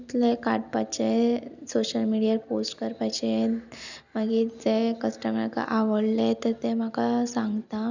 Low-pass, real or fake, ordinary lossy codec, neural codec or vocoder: 7.2 kHz; real; none; none